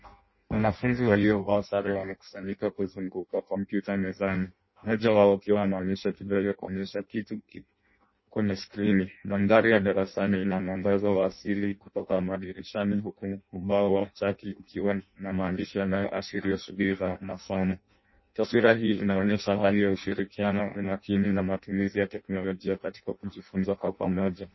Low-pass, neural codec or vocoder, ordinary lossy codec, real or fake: 7.2 kHz; codec, 16 kHz in and 24 kHz out, 0.6 kbps, FireRedTTS-2 codec; MP3, 24 kbps; fake